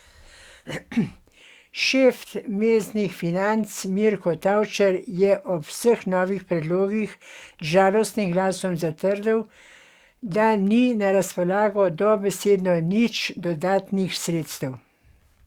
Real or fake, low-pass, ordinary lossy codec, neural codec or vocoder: fake; 19.8 kHz; Opus, 64 kbps; codec, 44.1 kHz, 7.8 kbps, DAC